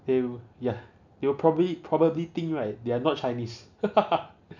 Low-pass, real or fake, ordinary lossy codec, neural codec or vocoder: 7.2 kHz; real; none; none